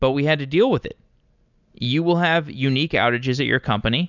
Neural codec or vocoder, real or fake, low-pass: none; real; 7.2 kHz